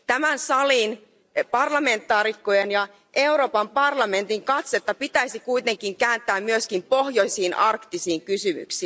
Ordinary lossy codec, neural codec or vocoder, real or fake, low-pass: none; none; real; none